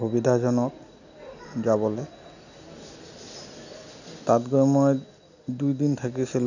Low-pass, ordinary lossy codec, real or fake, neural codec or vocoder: 7.2 kHz; none; real; none